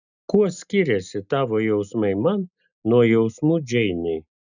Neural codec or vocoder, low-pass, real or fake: none; 7.2 kHz; real